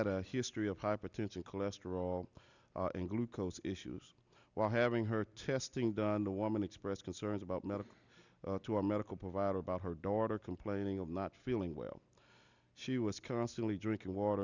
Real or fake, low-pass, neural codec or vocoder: real; 7.2 kHz; none